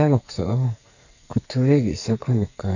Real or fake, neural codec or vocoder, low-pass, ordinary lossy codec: fake; codec, 44.1 kHz, 2.6 kbps, SNAC; 7.2 kHz; none